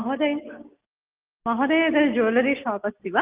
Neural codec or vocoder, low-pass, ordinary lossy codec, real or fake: none; 3.6 kHz; Opus, 32 kbps; real